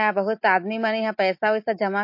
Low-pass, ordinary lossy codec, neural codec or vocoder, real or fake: 5.4 kHz; MP3, 32 kbps; none; real